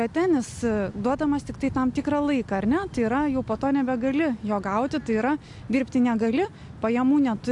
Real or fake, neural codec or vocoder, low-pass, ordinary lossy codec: real; none; 10.8 kHz; AAC, 64 kbps